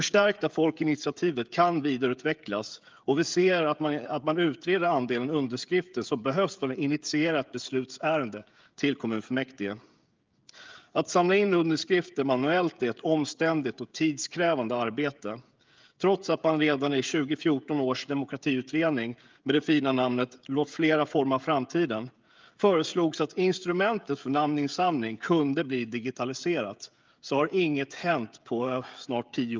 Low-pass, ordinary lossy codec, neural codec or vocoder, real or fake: 7.2 kHz; Opus, 24 kbps; codec, 16 kHz, 16 kbps, FreqCodec, smaller model; fake